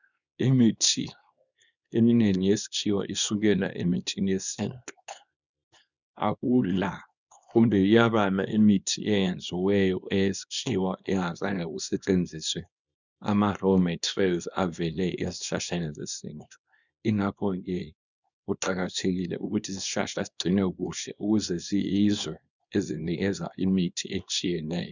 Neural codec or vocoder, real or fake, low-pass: codec, 24 kHz, 0.9 kbps, WavTokenizer, small release; fake; 7.2 kHz